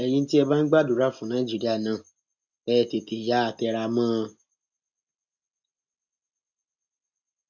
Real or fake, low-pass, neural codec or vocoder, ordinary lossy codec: real; 7.2 kHz; none; none